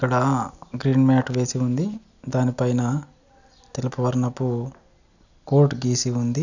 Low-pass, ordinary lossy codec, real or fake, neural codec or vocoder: 7.2 kHz; none; real; none